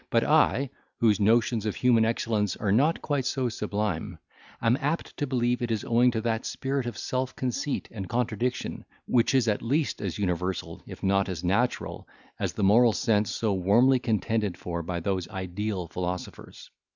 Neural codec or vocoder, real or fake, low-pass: none; real; 7.2 kHz